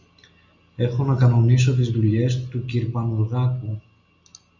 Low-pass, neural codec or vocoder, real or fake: 7.2 kHz; none; real